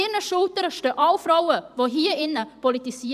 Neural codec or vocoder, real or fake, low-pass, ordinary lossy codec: vocoder, 44.1 kHz, 128 mel bands every 512 samples, BigVGAN v2; fake; 14.4 kHz; none